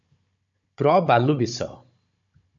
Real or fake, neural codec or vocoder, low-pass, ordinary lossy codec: fake; codec, 16 kHz, 16 kbps, FunCodec, trained on Chinese and English, 50 frames a second; 7.2 kHz; MP3, 48 kbps